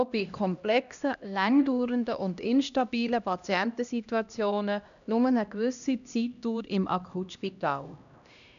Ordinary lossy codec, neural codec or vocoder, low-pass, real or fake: none; codec, 16 kHz, 1 kbps, X-Codec, HuBERT features, trained on LibriSpeech; 7.2 kHz; fake